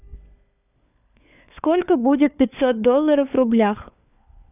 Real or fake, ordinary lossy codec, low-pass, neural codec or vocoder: fake; none; 3.6 kHz; codec, 16 kHz, 4 kbps, FunCodec, trained on LibriTTS, 50 frames a second